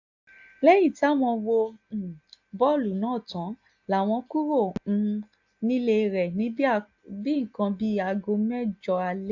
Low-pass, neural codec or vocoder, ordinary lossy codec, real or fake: 7.2 kHz; none; AAC, 48 kbps; real